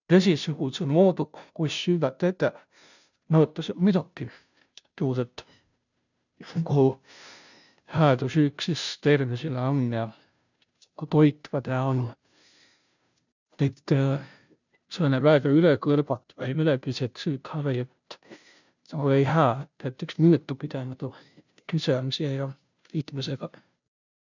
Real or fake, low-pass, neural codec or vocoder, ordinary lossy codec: fake; 7.2 kHz; codec, 16 kHz, 0.5 kbps, FunCodec, trained on Chinese and English, 25 frames a second; none